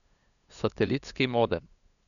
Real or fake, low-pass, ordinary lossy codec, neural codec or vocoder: fake; 7.2 kHz; none; codec, 16 kHz, 2 kbps, FunCodec, trained on LibriTTS, 25 frames a second